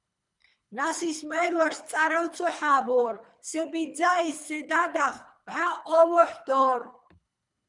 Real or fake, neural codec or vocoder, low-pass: fake; codec, 24 kHz, 3 kbps, HILCodec; 10.8 kHz